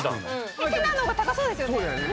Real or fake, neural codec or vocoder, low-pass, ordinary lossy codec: real; none; none; none